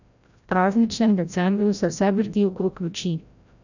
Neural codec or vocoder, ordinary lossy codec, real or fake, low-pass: codec, 16 kHz, 0.5 kbps, FreqCodec, larger model; none; fake; 7.2 kHz